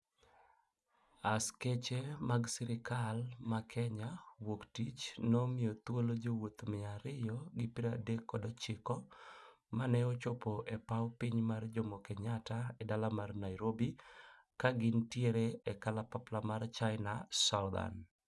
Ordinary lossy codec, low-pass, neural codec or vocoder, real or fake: none; none; none; real